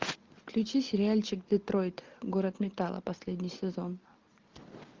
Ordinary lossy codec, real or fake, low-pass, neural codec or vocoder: Opus, 24 kbps; real; 7.2 kHz; none